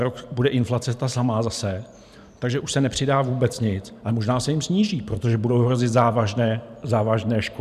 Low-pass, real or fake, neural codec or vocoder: 14.4 kHz; fake; vocoder, 44.1 kHz, 128 mel bands every 512 samples, BigVGAN v2